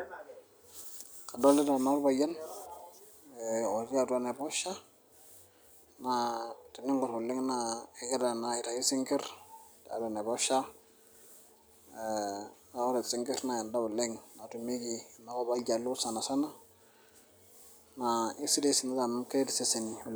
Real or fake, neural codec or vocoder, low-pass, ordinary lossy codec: real; none; none; none